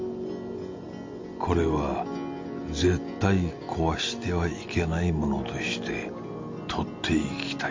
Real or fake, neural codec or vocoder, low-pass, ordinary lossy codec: real; none; 7.2 kHz; MP3, 48 kbps